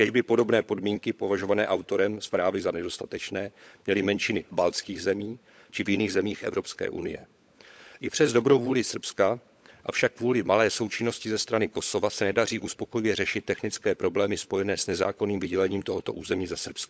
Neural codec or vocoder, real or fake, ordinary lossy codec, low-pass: codec, 16 kHz, 16 kbps, FunCodec, trained on LibriTTS, 50 frames a second; fake; none; none